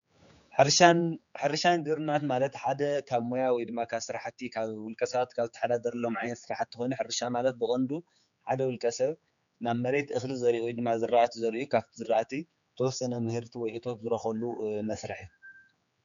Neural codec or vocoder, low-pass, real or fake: codec, 16 kHz, 4 kbps, X-Codec, HuBERT features, trained on general audio; 7.2 kHz; fake